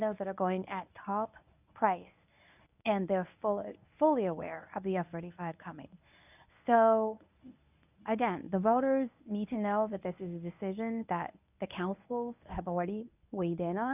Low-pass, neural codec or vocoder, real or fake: 3.6 kHz; codec, 24 kHz, 0.9 kbps, WavTokenizer, medium speech release version 1; fake